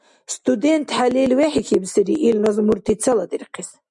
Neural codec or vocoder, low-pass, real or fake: none; 10.8 kHz; real